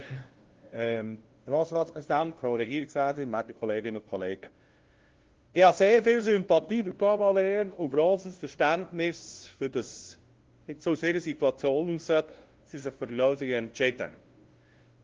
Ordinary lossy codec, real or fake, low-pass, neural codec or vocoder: Opus, 16 kbps; fake; 7.2 kHz; codec, 16 kHz, 0.5 kbps, FunCodec, trained on LibriTTS, 25 frames a second